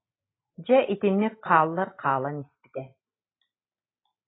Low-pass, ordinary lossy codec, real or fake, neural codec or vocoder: 7.2 kHz; AAC, 16 kbps; real; none